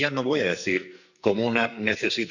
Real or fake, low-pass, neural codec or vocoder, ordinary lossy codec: fake; 7.2 kHz; codec, 44.1 kHz, 2.6 kbps, SNAC; none